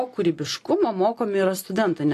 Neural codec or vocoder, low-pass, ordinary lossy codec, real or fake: none; 14.4 kHz; AAC, 48 kbps; real